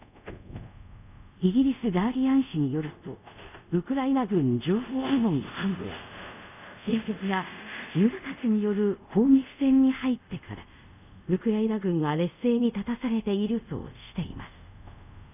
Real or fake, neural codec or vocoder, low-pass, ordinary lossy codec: fake; codec, 24 kHz, 0.5 kbps, DualCodec; 3.6 kHz; none